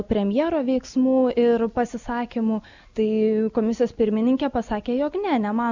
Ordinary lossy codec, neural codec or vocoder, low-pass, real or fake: Opus, 64 kbps; none; 7.2 kHz; real